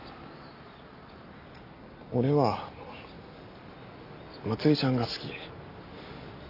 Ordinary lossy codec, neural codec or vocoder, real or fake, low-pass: AAC, 32 kbps; none; real; 5.4 kHz